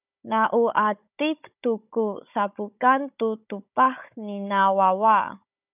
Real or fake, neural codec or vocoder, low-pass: fake; codec, 16 kHz, 16 kbps, FunCodec, trained on Chinese and English, 50 frames a second; 3.6 kHz